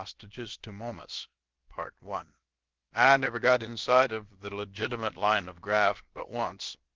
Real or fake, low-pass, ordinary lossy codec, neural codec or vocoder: fake; 7.2 kHz; Opus, 16 kbps; codec, 16 kHz, about 1 kbps, DyCAST, with the encoder's durations